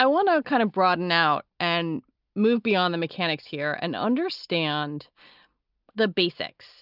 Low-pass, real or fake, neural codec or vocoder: 5.4 kHz; real; none